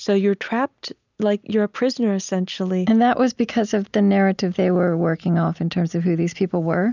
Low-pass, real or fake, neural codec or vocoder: 7.2 kHz; real; none